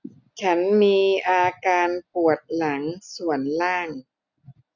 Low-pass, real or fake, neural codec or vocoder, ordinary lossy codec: 7.2 kHz; real; none; none